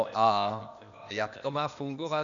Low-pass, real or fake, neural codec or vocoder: 7.2 kHz; fake; codec, 16 kHz, 0.8 kbps, ZipCodec